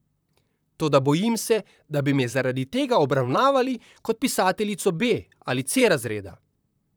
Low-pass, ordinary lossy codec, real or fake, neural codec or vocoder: none; none; fake; vocoder, 44.1 kHz, 128 mel bands, Pupu-Vocoder